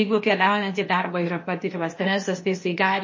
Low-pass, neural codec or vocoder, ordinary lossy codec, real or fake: 7.2 kHz; codec, 16 kHz, 0.8 kbps, ZipCodec; MP3, 32 kbps; fake